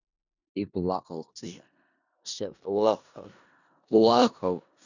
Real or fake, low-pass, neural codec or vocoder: fake; 7.2 kHz; codec, 16 kHz in and 24 kHz out, 0.4 kbps, LongCat-Audio-Codec, four codebook decoder